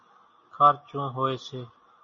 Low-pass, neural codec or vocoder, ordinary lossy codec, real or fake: 7.2 kHz; none; MP3, 32 kbps; real